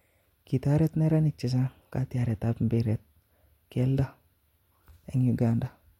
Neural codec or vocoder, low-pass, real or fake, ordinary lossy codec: none; 19.8 kHz; real; MP3, 64 kbps